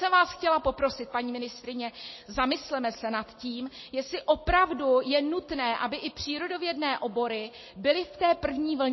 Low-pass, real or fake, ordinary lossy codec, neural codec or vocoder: 7.2 kHz; real; MP3, 24 kbps; none